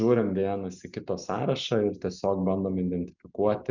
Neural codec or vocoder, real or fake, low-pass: none; real; 7.2 kHz